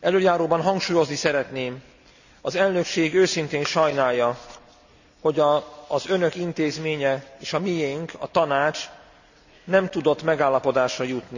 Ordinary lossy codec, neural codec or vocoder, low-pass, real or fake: none; none; 7.2 kHz; real